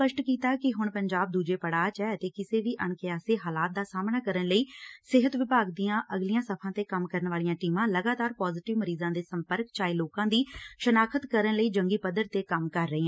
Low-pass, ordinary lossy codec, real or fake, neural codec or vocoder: none; none; real; none